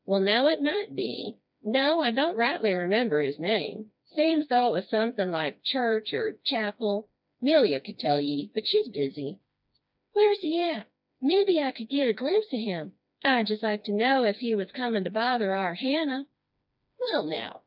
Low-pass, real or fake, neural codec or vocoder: 5.4 kHz; fake; codec, 16 kHz, 2 kbps, FreqCodec, smaller model